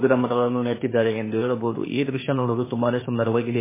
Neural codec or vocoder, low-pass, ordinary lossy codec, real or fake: codec, 16 kHz, 0.8 kbps, ZipCodec; 3.6 kHz; MP3, 16 kbps; fake